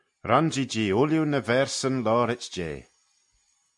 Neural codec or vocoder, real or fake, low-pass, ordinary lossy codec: none; real; 10.8 kHz; MP3, 64 kbps